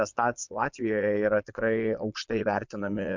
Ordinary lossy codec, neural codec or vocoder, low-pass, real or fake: MP3, 64 kbps; vocoder, 22.05 kHz, 80 mel bands, Vocos; 7.2 kHz; fake